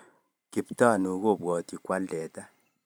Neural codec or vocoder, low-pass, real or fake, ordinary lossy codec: vocoder, 44.1 kHz, 128 mel bands every 512 samples, BigVGAN v2; none; fake; none